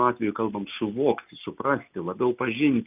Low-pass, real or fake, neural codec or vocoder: 3.6 kHz; real; none